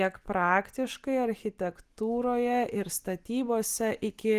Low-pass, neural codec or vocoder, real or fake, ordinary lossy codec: 14.4 kHz; none; real; Opus, 24 kbps